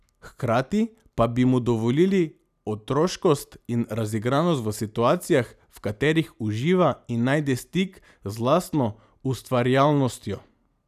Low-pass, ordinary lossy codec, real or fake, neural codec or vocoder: 14.4 kHz; none; real; none